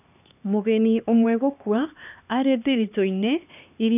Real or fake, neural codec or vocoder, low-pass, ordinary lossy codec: fake; codec, 16 kHz, 2 kbps, X-Codec, HuBERT features, trained on LibriSpeech; 3.6 kHz; none